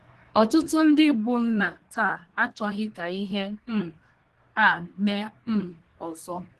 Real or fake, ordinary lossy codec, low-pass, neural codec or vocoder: fake; Opus, 16 kbps; 10.8 kHz; codec, 24 kHz, 1 kbps, SNAC